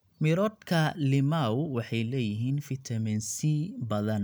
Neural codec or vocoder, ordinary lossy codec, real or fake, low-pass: none; none; real; none